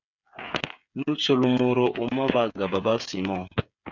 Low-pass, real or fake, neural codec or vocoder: 7.2 kHz; fake; codec, 16 kHz, 8 kbps, FreqCodec, smaller model